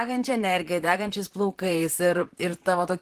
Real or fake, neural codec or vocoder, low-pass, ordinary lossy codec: fake; vocoder, 44.1 kHz, 128 mel bands, Pupu-Vocoder; 14.4 kHz; Opus, 16 kbps